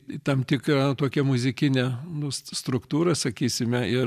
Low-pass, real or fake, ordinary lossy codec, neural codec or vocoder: 14.4 kHz; real; MP3, 96 kbps; none